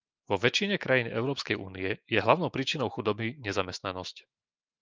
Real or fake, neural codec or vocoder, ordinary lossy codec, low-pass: fake; autoencoder, 48 kHz, 128 numbers a frame, DAC-VAE, trained on Japanese speech; Opus, 24 kbps; 7.2 kHz